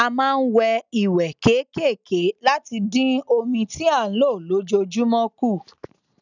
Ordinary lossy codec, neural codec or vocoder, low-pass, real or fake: none; none; 7.2 kHz; real